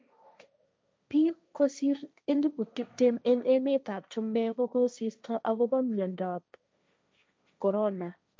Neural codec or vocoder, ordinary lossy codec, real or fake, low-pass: codec, 16 kHz, 1.1 kbps, Voila-Tokenizer; none; fake; none